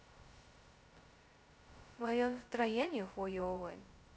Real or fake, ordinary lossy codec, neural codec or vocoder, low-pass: fake; none; codec, 16 kHz, 0.2 kbps, FocalCodec; none